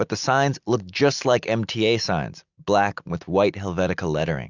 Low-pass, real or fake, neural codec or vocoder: 7.2 kHz; real; none